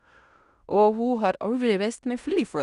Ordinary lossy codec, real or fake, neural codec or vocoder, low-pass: Opus, 64 kbps; fake; codec, 16 kHz in and 24 kHz out, 0.9 kbps, LongCat-Audio-Codec, fine tuned four codebook decoder; 10.8 kHz